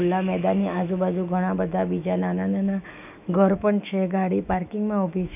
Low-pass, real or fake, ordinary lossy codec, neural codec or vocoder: 3.6 kHz; fake; none; autoencoder, 48 kHz, 128 numbers a frame, DAC-VAE, trained on Japanese speech